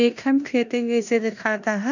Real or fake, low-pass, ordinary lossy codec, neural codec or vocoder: fake; 7.2 kHz; none; codec, 16 kHz in and 24 kHz out, 0.9 kbps, LongCat-Audio-Codec, four codebook decoder